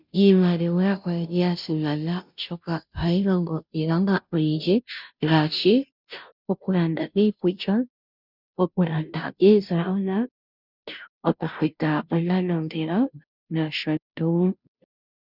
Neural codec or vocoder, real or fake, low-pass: codec, 16 kHz, 0.5 kbps, FunCodec, trained on Chinese and English, 25 frames a second; fake; 5.4 kHz